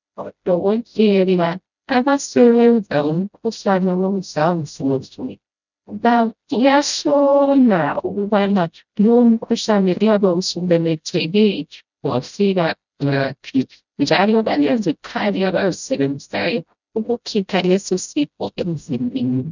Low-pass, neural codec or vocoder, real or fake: 7.2 kHz; codec, 16 kHz, 0.5 kbps, FreqCodec, smaller model; fake